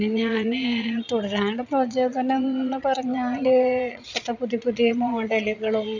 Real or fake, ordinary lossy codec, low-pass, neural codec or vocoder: fake; none; 7.2 kHz; vocoder, 22.05 kHz, 80 mel bands, Vocos